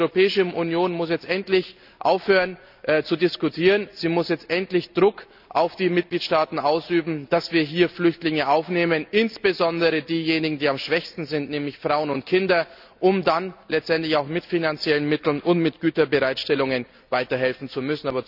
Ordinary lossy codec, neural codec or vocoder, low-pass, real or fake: none; none; 5.4 kHz; real